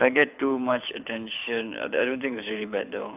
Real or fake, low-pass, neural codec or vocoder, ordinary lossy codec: fake; 3.6 kHz; codec, 24 kHz, 6 kbps, HILCodec; none